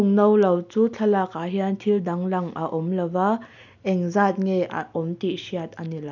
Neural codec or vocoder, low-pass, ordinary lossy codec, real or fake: none; 7.2 kHz; none; real